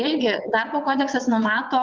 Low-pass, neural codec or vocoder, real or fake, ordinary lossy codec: 7.2 kHz; vocoder, 22.05 kHz, 80 mel bands, WaveNeXt; fake; Opus, 24 kbps